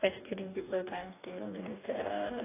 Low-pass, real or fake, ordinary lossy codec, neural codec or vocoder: 3.6 kHz; fake; AAC, 24 kbps; codec, 44.1 kHz, 2.6 kbps, DAC